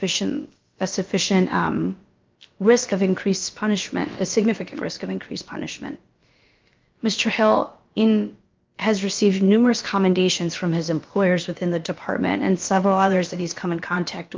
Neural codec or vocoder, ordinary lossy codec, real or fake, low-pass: codec, 16 kHz, about 1 kbps, DyCAST, with the encoder's durations; Opus, 24 kbps; fake; 7.2 kHz